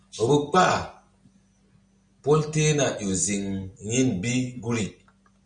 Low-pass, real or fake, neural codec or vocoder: 9.9 kHz; real; none